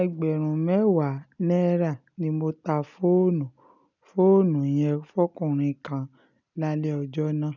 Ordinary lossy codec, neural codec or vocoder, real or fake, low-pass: none; none; real; 7.2 kHz